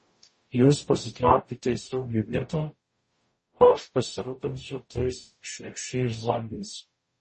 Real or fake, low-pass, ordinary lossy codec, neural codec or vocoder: fake; 10.8 kHz; MP3, 32 kbps; codec, 44.1 kHz, 0.9 kbps, DAC